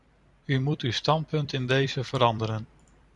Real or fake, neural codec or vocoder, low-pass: fake; vocoder, 44.1 kHz, 128 mel bands every 256 samples, BigVGAN v2; 10.8 kHz